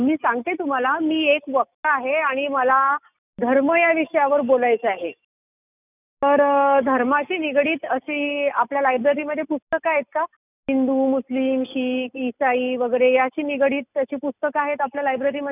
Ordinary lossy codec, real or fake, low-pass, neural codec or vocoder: none; real; 3.6 kHz; none